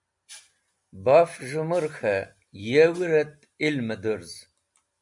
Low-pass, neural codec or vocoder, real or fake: 10.8 kHz; none; real